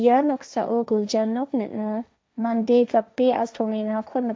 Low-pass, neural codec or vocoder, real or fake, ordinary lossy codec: none; codec, 16 kHz, 1.1 kbps, Voila-Tokenizer; fake; none